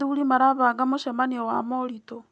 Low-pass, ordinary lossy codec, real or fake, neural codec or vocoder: none; none; real; none